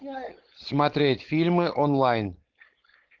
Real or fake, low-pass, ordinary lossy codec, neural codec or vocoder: fake; 7.2 kHz; Opus, 16 kbps; codec, 16 kHz, 4.8 kbps, FACodec